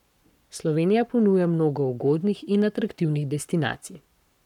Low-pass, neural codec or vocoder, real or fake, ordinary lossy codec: 19.8 kHz; codec, 44.1 kHz, 7.8 kbps, Pupu-Codec; fake; none